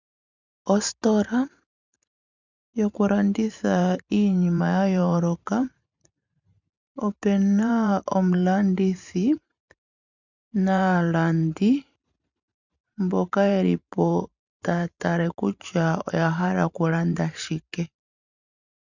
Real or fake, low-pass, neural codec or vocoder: fake; 7.2 kHz; vocoder, 44.1 kHz, 128 mel bands every 512 samples, BigVGAN v2